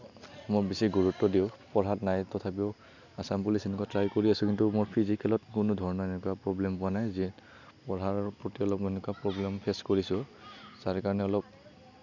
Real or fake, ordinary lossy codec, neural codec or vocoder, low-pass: real; none; none; 7.2 kHz